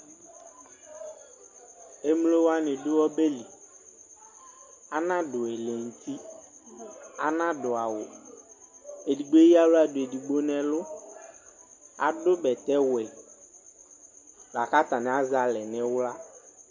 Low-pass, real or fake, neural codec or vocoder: 7.2 kHz; real; none